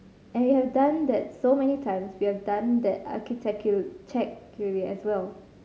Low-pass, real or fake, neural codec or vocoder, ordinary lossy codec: none; real; none; none